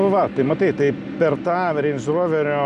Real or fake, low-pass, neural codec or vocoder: real; 10.8 kHz; none